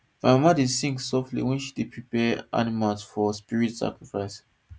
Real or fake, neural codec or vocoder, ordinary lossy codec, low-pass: real; none; none; none